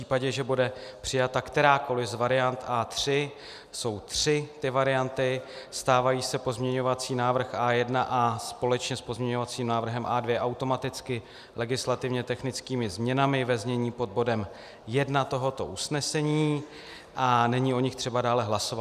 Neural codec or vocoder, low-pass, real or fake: none; 14.4 kHz; real